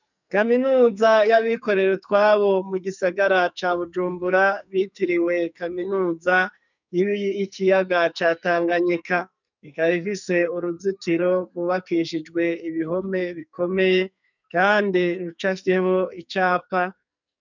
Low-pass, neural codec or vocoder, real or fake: 7.2 kHz; codec, 44.1 kHz, 2.6 kbps, SNAC; fake